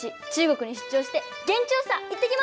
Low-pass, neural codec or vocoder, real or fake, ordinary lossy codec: none; none; real; none